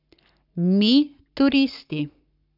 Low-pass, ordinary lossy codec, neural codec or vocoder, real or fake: 5.4 kHz; none; codec, 44.1 kHz, 7.8 kbps, Pupu-Codec; fake